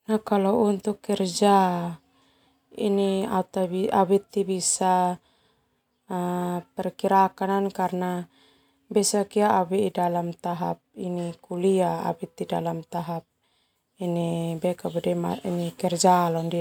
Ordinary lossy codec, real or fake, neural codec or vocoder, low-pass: none; real; none; 19.8 kHz